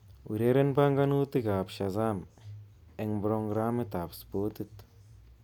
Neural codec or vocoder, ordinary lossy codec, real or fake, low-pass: none; none; real; 19.8 kHz